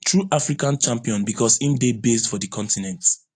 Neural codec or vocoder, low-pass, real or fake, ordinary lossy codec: none; 9.9 kHz; real; AAC, 48 kbps